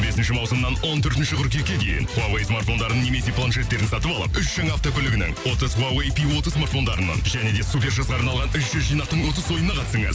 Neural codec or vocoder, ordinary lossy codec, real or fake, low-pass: none; none; real; none